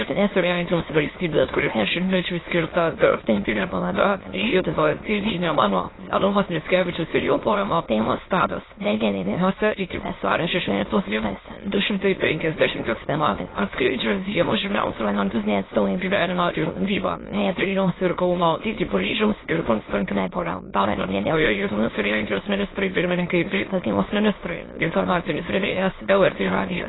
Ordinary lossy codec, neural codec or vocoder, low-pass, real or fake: AAC, 16 kbps; autoencoder, 22.05 kHz, a latent of 192 numbers a frame, VITS, trained on many speakers; 7.2 kHz; fake